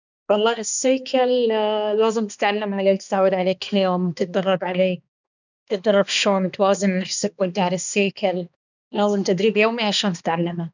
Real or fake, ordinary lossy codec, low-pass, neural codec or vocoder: fake; none; 7.2 kHz; codec, 16 kHz, 2 kbps, X-Codec, HuBERT features, trained on balanced general audio